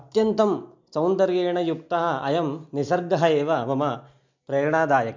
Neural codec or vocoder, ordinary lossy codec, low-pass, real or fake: none; MP3, 64 kbps; 7.2 kHz; real